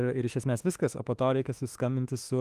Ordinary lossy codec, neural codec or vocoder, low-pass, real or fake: Opus, 24 kbps; autoencoder, 48 kHz, 32 numbers a frame, DAC-VAE, trained on Japanese speech; 14.4 kHz; fake